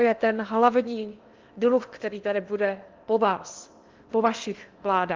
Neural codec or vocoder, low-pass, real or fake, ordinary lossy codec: codec, 16 kHz in and 24 kHz out, 0.8 kbps, FocalCodec, streaming, 65536 codes; 7.2 kHz; fake; Opus, 24 kbps